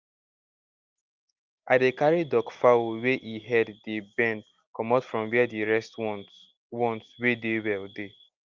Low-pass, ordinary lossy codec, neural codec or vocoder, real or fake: 7.2 kHz; Opus, 32 kbps; none; real